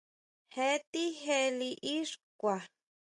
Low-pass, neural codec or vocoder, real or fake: 10.8 kHz; none; real